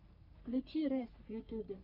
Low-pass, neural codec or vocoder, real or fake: 5.4 kHz; codec, 44.1 kHz, 3.4 kbps, Pupu-Codec; fake